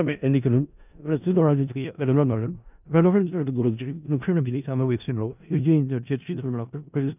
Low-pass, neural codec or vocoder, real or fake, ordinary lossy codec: 3.6 kHz; codec, 16 kHz in and 24 kHz out, 0.4 kbps, LongCat-Audio-Codec, four codebook decoder; fake; none